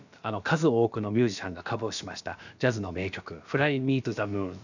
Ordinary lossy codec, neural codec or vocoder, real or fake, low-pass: none; codec, 16 kHz, about 1 kbps, DyCAST, with the encoder's durations; fake; 7.2 kHz